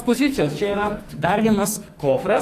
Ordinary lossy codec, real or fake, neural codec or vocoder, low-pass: AAC, 64 kbps; fake; codec, 44.1 kHz, 2.6 kbps, SNAC; 14.4 kHz